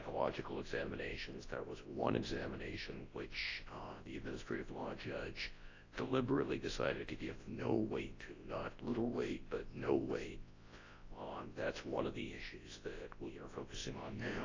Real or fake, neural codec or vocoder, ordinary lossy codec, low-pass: fake; codec, 24 kHz, 0.9 kbps, WavTokenizer, large speech release; AAC, 32 kbps; 7.2 kHz